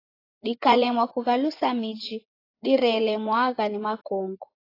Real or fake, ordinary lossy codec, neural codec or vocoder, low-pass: fake; AAC, 24 kbps; vocoder, 24 kHz, 100 mel bands, Vocos; 5.4 kHz